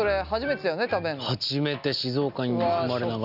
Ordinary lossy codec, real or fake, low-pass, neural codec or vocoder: none; real; 5.4 kHz; none